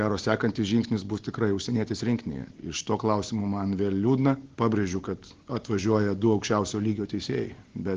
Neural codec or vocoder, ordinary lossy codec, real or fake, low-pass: none; Opus, 16 kbps; real; 7.2 kHz